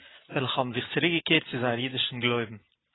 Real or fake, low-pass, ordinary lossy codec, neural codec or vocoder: real; 7.2 kHz; AAC, 16 kbps; none